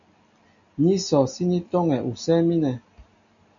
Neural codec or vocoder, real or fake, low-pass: none; real; 7.2 kHz